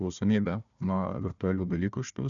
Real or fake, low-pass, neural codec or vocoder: fake; 7.2 kHz; codec, 16 kHz, 1 kbps, FunCodec, trained on Chinese and English, 50 frames a second